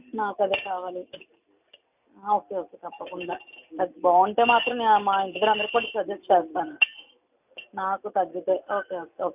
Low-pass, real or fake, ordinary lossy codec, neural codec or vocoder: 3.6 kHz; real; none; none